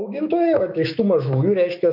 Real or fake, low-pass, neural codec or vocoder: fake; 5.4 kHz; autoencoder, 48 kHz, 128 numbers a frame, DAC-VAE, trained on Japanese speech